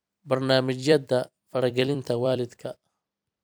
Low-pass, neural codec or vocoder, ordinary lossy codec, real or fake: none; vocoder, 44.1 kHz, 128 mel bands every 256 samples, BigVGAN v2; none; fake